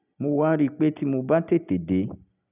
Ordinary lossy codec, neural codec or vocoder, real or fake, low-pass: none; none; real; 3.6 kHz